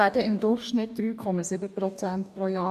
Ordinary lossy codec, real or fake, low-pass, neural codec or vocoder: none; fake; 14.4 kHz; codec, 44.1 kHz, 2.6 kbps, DAC